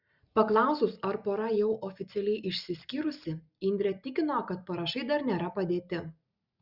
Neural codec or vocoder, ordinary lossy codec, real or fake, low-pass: none; Opus, 64 kbps; real; 5.4 kHz